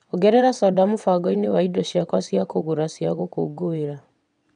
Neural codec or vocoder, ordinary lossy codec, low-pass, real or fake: vocoder, 22.05 kHz, 80 mel bands, WaveNeXt; none; 9.9 kHz; fake